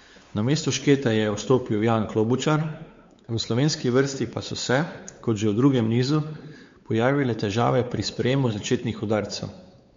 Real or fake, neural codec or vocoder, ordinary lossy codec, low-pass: fake; codec, 16 kHz, 4 kbps, X-Codec, WavLM features, trained on Multilingual LibriSpeech; MP3, 48 kbps; 7.2 kHz